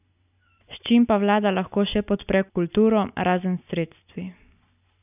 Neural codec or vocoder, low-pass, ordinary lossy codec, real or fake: none; 3.6 kHz; none; real